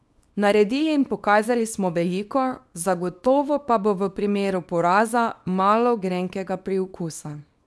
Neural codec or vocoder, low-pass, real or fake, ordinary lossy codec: codec, 24 kHz, 0.9 kbps, WavTokenizer, small release; none; fake; none